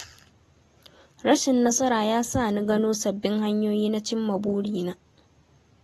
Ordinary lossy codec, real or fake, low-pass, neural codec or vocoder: AAC, 32 kbps; real; 19.8 kHz; none